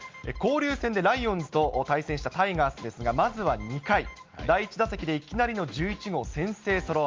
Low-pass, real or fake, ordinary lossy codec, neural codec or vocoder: 7.2 kHz; real; Opus, 32 kbps; none